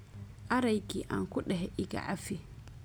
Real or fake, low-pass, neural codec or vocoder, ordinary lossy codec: real; none; none; none